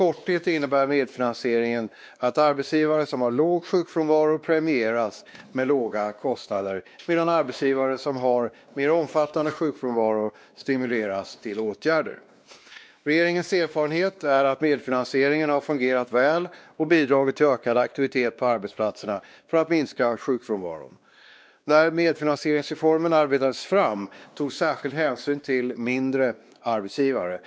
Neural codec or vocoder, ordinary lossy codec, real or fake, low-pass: codec, 16 kHz, 2 kbps, X-Codec, WavLM features, trained on Multilingual LibriSpeech; none; fake; none